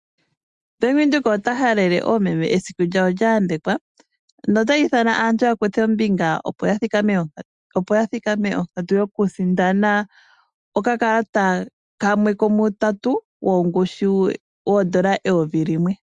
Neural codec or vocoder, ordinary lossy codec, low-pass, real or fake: none; Opus, 64 kbps; 10.8 kHz; real